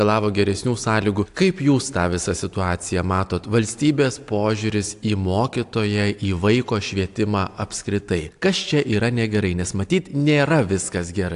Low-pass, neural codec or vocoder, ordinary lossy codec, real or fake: 10.8 kHz; none; AAC, 64 kbps; real